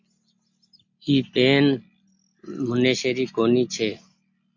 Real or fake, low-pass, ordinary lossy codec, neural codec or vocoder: real; 7.2 kHz; MP3, 48 kbps; none